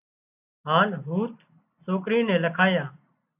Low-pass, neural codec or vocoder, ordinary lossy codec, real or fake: 3.6 kHz; none; AAC, 32 kbps; real